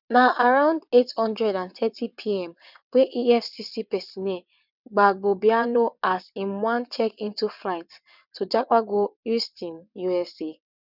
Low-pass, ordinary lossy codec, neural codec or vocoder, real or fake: 5.4 kHz; none; vocoder, 22.05 kHz, 80 mel bands, WaveNeXt; fake